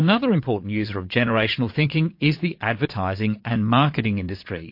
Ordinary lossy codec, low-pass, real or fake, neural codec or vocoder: MP3, 32 kbps; 5.4 kHz; fake; vocoder, 22.05 kHz, 80 mel bands, Vocos